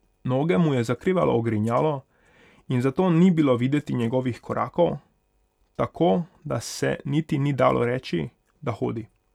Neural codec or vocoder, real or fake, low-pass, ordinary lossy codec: none; real; 19.8 kHz; none